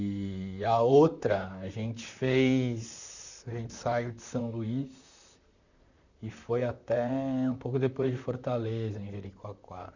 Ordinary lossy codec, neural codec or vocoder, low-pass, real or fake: none; vocoder, 44.1 kHz, 128 mel bands, Pupu-Vocoder; 7.2 kHz; fake